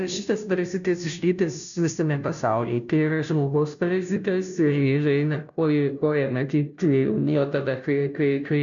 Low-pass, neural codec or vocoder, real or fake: 7.2 kHz; codec, 16 kHz, 0.5 kbps, FunCodec, trained on Chinese and English, 25 frames a second; fake